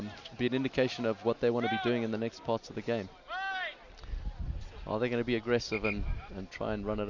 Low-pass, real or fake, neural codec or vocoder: 7.2 kHz; real; none